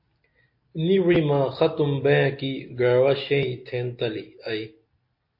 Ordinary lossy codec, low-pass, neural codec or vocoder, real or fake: MP3, 32 kbps; 5.4 kHz; none; real